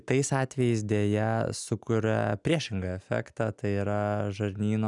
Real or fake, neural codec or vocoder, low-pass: real; none; 10.8 kHz